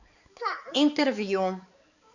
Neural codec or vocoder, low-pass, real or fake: codec, 16 kHz, 4 kbps, X-Codec, HuBERT features, trained on balanced general audio; 7.2 kHz; fake